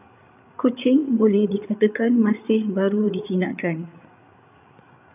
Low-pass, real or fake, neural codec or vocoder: 3.6 kHz; fake; codec, 16 kHz, 8 kbps, FreqCodec, larger model